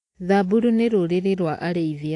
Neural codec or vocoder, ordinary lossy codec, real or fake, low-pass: none; AAC, 48 kbps; real; 10.8 kHz